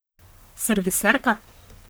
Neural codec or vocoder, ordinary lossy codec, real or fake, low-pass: codec, 44.1 kHz, 1.7 kbps, Pupu-Codec; none; fake; none